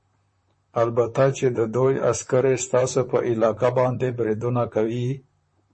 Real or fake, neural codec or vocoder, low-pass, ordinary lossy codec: fake; vocoder, 44.1 kHz, 128 mel bands, Pupu-Vocoder; 10.8 kHz; MP3, 32 kbps